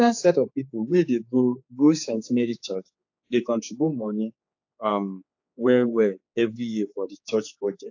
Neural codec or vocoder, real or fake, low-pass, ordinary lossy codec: codec, 16 kHz, 4 kbps, X-Codec, HuBERT features, trained on general audio; fake; 7.2 kHz; AAC, 48 kbps